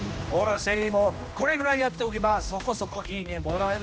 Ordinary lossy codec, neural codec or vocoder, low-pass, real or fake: none; codec, 16 kHz, 1 kbps, X-Codec, HuBERT features, trained on general audio; none; fake